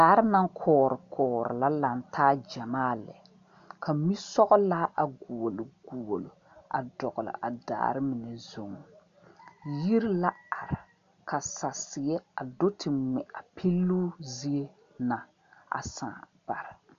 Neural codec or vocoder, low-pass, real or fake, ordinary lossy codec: none; 7.2 kHz; real; MP3, 64 kbps